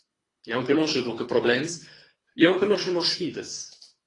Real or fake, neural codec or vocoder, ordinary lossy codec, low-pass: fake; codec, 24 kHz, 3 kbps, HILCodec; AAC, 32 kbps; 10.8 kHz